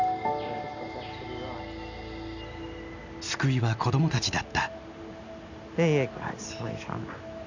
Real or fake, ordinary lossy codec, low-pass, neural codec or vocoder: real; none; 7.2 kHz; none